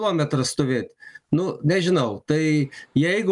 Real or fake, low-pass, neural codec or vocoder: real; 10.8 kHz; none